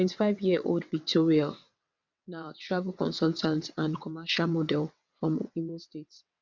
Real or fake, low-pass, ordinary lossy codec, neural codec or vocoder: fake; 7.2 kHz; MP3, 64 kbps; vocoder, 22.05 kHz, 80 mel bands, WaveNeXt